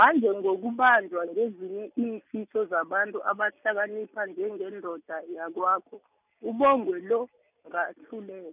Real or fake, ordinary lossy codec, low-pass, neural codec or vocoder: real; none; 3.6 kHz; none